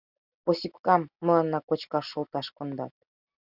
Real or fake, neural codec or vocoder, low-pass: real; none; 5.4 kHz